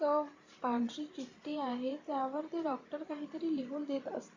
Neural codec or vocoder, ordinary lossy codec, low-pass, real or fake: vocoder, 44.1 kHz, 128 mel bands every 512 samples, BigVGAN v2; Opus, 64 kbps; 7.2 kHz; fake